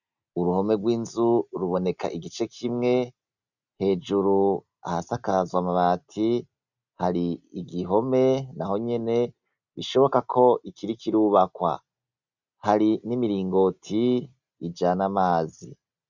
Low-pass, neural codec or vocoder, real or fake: 7.2 kHz; none; real